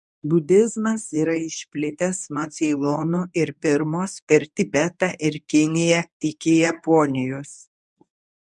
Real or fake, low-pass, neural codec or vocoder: fake; 10.8 kHz; codec, 24 kHz, 0.9 kbps, WavTokenizer, medium speech release version 1